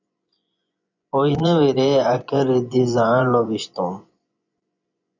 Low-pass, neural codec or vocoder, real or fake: 7.2 kHz; vocoder, 24 kHz, 100 mel bands, Vocos; fake